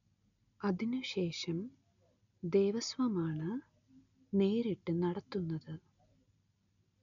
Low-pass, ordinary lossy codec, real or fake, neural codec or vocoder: 7.2 kHz; none; real; none